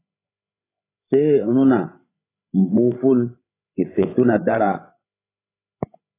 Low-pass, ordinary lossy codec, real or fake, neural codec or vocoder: 3.6 kHz; AAC, 16 kbps; fake; codec, 16 kHz, 16 kbps, FreqCodec, larger model